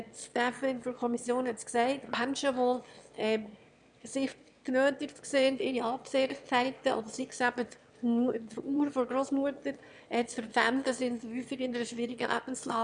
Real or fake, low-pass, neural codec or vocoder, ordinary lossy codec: fake; 9.9 kHz; autoencoder, 22.05 kHz, a latent of 192 numbers a frame, VITS, trained on one speaker; none